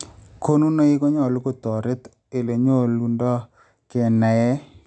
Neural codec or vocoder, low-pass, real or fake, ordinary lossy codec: none; 9.9 kHz; real; none